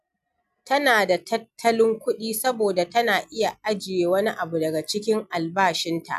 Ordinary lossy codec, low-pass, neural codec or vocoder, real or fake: none; 14.4 kHz; none; real